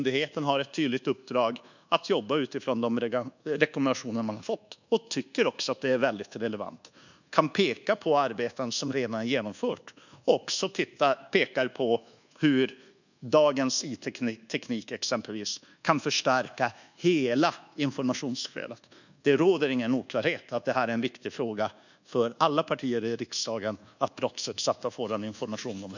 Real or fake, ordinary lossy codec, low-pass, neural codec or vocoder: fake; none; 7.2 kHz; codec, 24 kHz, 1.2 kbps, DualCodec